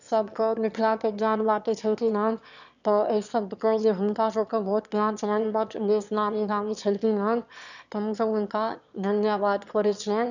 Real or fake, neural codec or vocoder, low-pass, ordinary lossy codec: fake; autoencoder, 22.05 kHz, a latent of 192 numbers a frame, VITS, trained on one speaker; 7.2 kHz; none